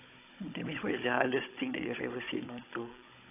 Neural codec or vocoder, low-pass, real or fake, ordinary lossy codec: codec, 16 kHz, 16 kbps, FunCodec, trained on Chinese and English, 50 frames a second; 3.6 kHz; fake; AAC, 24 kbps